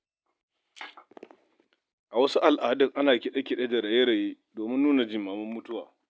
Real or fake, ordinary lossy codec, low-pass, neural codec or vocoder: real; none; none; none